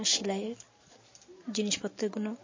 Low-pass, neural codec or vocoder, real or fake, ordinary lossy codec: 7.2 kHz; none; real; MP3, 32 kbps